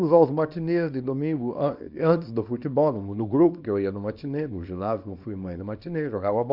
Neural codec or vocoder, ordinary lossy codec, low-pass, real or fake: codec, 24 kHz, 0.9 kbps, WavTokenizer, small release; Opus, 64 kbps; 5.4 kHz; fake